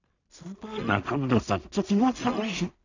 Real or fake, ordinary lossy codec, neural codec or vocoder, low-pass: fake; none; codec, 16 kHz in and 24 kHz out, 0.4 kbps, LongCat-Audio-Codec, two codebook decoder; 7.2 kHz